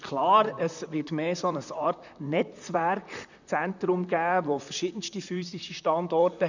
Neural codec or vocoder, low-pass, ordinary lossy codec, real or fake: vocoder, 44.1 kHz, 128 mel bands, Pupu-Vocoder; 7.2 kHz; none; fake